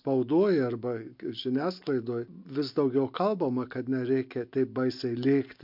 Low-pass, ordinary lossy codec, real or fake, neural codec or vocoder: 5.4 kHz; MP3, 48 kbps; real; none